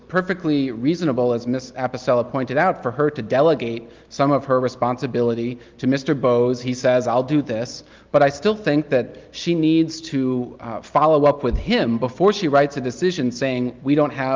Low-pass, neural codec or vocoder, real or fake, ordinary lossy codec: 7.2 kHz; none; real; Opus, 32 kbps